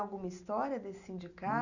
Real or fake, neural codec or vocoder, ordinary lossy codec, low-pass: real; none; none; 7.2 kHz